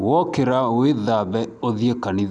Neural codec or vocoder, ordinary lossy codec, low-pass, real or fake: none; none; 10.8 kHz; real